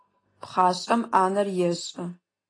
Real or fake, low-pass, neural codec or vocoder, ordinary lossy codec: real; 9.9 kHz; none; AAC, 32 kbps